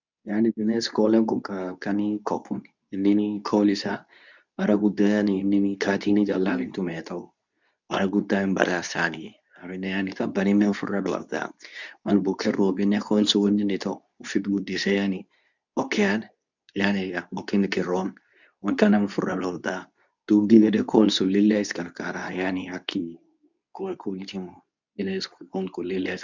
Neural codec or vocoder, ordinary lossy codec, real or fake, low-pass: codec, 24 kHz, 0.9 kbps, WavTokenizer, medium speech release version 2; none; fake; 7.2 kHz